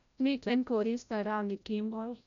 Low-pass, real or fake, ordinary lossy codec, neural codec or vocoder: 7.2 kHz; fake; none; codec, 16 kHz, 0.5 kbps, FreqCodec, larger model